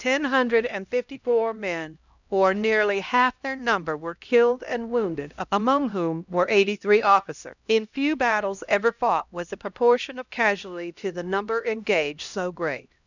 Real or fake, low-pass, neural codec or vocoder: fake; 7.2 kHz; codec, 16 kHz, 1 kbps, X-Codec, HuBERT features, trained on LibriSpeech